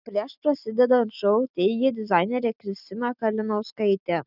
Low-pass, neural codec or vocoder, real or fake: 5.4 kHz; none; real